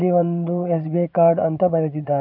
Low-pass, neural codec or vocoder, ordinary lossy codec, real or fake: 5.4 kHz; vocoder, 22.05 kHz, 80 mel bands, Vocos; none; fake